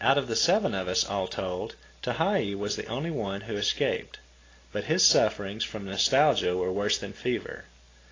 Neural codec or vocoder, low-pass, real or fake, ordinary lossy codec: none; 7.2 kHz; real; AAC, 32 kbps